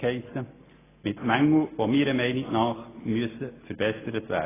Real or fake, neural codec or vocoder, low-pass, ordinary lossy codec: real; none; 3.6 kHz; AAC, 16 kbps